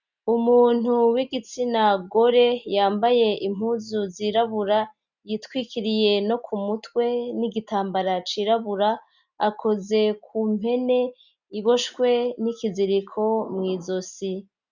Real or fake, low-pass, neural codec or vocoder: real; 7.2 kHz; none